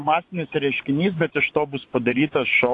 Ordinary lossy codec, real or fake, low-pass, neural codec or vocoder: AAC, 48 kbps; fake; 10.8 kHz; vocoder, 24 kHz, 100 mel bands, Vocos